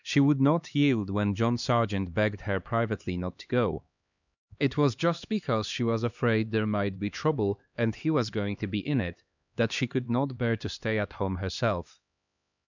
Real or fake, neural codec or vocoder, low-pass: fake; codec, 16 kHz, 2 kbps, X-Codec, HuBERT features, trained on LibriSpeech; 7.2 kHz